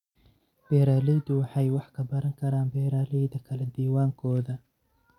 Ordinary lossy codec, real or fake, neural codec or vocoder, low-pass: none; real; none; 19.8 kHz